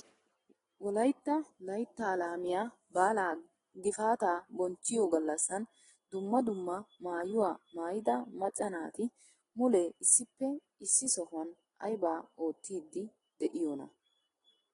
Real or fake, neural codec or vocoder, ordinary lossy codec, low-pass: real; none; AAC, 32 kbps; 10.8 kHz